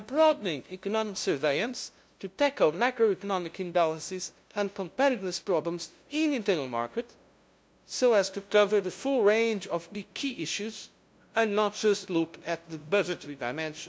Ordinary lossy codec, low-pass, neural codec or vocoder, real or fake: none; none; codec, 16 kHz, 0.5 kbps, FunCodec, trained on LibriTTS, 25 frames a second; fake